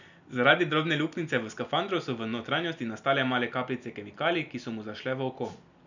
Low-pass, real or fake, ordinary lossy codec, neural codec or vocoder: 7.2 kHz; real; none; none